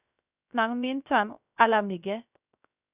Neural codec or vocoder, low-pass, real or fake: codec, 16 kHz, 0.3 kbps, FocalCodec; 3.6 kHz; fake